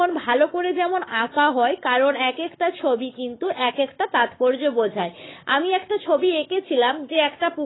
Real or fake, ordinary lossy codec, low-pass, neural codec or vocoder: fake; AAC, 16 kbps; 7.2 kHz; codec, 44.1 kHz, 7.8 kbps, Pupu-Codec